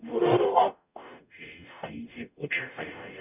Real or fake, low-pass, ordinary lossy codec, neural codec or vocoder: fake; 3.6 kHz; none; codec, 44.1 kHz, 0.9 kbps, DAC